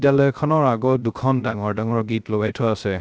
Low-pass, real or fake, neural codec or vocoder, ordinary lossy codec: none; fake; codec, 16 kHz, 0.3 kbps, FocalCodec; none